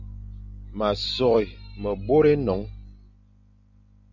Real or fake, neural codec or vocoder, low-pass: real; none; 7.2 kHz